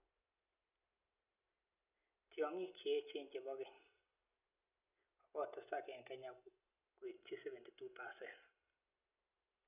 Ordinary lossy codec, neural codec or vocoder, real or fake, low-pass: none; none; real; 3.6 kHz